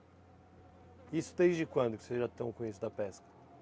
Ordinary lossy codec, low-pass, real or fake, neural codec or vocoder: none; none; real; none